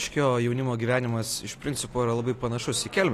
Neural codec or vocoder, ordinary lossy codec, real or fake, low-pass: none; AAC, 48 kbps; real; 14.4 kHz